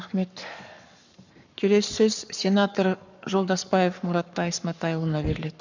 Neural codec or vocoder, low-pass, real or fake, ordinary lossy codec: codec, 44.1 kHz, 7.8 kbps, Pupu-Codec; 7.2 kHz; fake; none